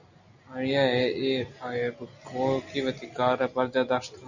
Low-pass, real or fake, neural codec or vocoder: 7.2 kHz; real; none